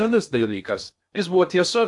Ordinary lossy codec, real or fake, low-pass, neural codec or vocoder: AAC, 64 kbps; fake; 10.8 kHz; codec, 16 kHz in and 24 kHz out, 0.8 kbps, FocalCodec, streaming, 65536 codes